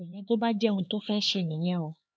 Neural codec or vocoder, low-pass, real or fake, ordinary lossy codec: codec, 16 kHz, 4 kbps, X-Codec, HuBERT features, trained on LibriSpeech; none; fake; none